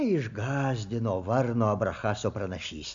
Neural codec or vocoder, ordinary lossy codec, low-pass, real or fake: none; AAC, 64 kbps; 7.2 kHz; real